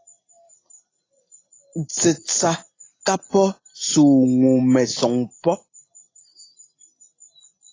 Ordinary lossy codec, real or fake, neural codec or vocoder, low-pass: AAC, 32 kbps; real; none; 7.2 kHz